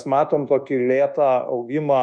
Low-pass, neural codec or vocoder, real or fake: 9.9 kHz; codec, 24 kHz, 1.2 kbps, DualCodec; fake